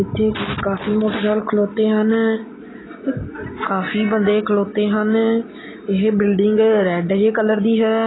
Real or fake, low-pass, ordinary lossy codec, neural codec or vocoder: real; 7.2 kHz; AAC, 16 kbps; none